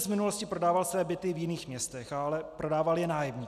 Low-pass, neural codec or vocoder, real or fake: 14.4 kHz; none; real